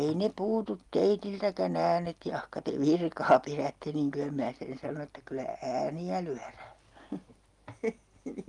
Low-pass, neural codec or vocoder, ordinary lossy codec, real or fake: 10.8 kHz; none; Opus, 32 kbps; real